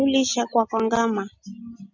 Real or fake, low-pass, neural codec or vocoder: real; 7.2 kHz; none